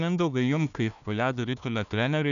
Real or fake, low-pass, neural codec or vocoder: fake; 7.2 kHz; codec, 16 kHz, 1 kbps, FunCodec, trained on Chinese and English, 50 frames a second